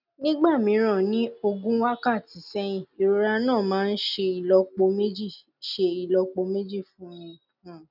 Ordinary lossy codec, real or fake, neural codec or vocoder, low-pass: none; real; none; 5.4 kHz